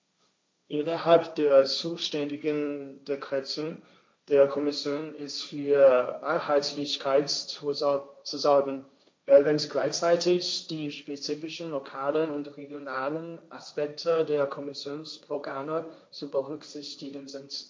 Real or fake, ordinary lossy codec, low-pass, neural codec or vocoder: fake; none; none; codec, 16 kHz, 1.1 kbps, Voila-Tokenizer